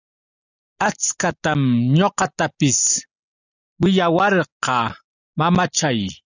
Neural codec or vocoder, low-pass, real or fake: none; 7.2 kHz; real